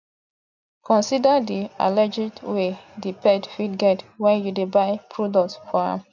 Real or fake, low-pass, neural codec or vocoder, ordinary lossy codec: real; 7.2 kHz; none; none